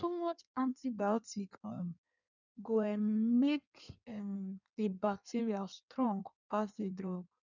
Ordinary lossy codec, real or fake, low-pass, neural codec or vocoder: none; fake; 7.2 kHz; codec, 16 kHz in and 24 kHz out, 1.1 kbps, FireRedTTS-2 codec